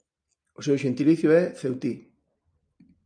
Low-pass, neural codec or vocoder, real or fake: 9.9 kHz; none; real